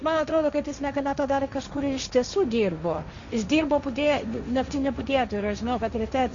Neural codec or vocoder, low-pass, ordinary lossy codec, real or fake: codec, 16 kHz, 1.1 kbps, Voila-Tokenizer; 7.2 kHz; Opus, 64 kbps; fake